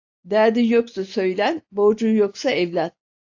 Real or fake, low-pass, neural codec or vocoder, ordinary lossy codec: real; 7.2 kHz; none; AAC, 48 kbps